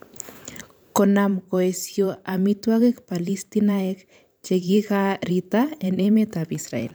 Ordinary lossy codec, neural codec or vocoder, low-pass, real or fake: none; vocoder, 44.1 kHz, 128 mel bands every 512 samples, BigVGAN v2; none; fake